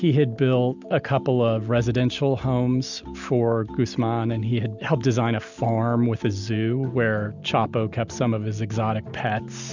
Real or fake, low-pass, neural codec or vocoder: real; 7.2 kHz; none